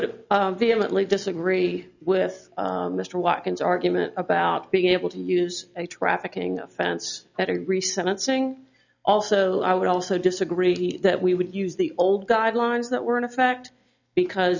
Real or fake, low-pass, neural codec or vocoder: real; 7.2 kHz; none